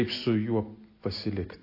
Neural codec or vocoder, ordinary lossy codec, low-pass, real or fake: none; AAC, 32 kbps; 5.4 kHz; real